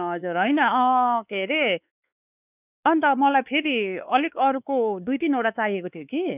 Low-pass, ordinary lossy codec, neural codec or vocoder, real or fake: 3.6 kHz; none; codec, 16 kHz, 2 kbps, X-Codec, WavLM features, trained on Multilingual LibriSpeech; fake